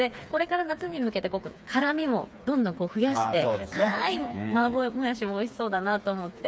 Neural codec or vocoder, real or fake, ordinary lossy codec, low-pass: codec, 16 kHz, 4 kbps, FreqCodec, smaller model; fake; none; none